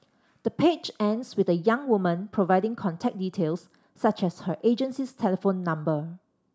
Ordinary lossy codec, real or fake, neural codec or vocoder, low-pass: none; real; none; none